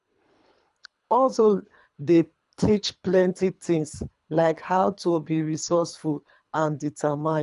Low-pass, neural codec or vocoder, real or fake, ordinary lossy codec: 10.8 kHz; codec, 24 kHz, 3 kbps, HILCodec; fake; none